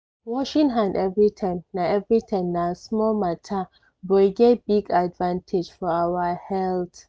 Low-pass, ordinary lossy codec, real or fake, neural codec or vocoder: none; none; real; none